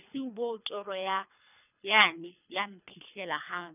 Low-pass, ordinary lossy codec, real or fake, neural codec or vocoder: 3.6 kHz; none; fake; codec, 24 kHz, 3 kbps, HILCodec